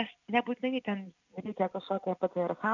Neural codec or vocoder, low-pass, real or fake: none; 7.2 kHz; real